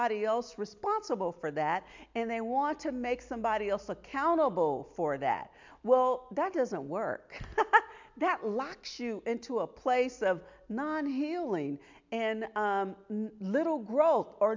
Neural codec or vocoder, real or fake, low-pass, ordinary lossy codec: none; real; 7.2 kHz; MP3, 64 kbps